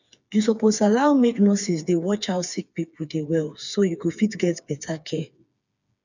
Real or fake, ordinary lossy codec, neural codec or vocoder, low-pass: fake; none; codec, 16 kHz, 4 kbps, FreqCodec, smaller model; 7.2 kHz